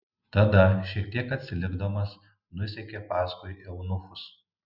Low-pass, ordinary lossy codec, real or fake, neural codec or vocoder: 5.4 kHz; Opus, 64 kbps; real; none